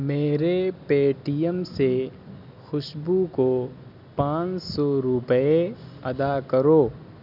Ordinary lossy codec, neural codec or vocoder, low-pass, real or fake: none; none; 5.4 kHz; real